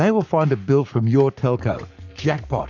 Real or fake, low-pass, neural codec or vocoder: fake; 7.2 kHz; codec, 44.1 kHz, 7.8 kbps, Pupu-Codec